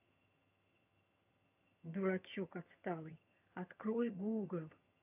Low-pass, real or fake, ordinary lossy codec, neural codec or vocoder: 3.6 kHz; fake; none; vocoder, 22.05 kHz, 80 mel bands, HiFi-GAN